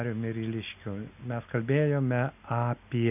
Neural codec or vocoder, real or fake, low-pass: none; real; 3.6 kHz